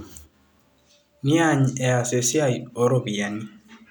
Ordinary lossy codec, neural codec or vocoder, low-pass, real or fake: none; none; none; real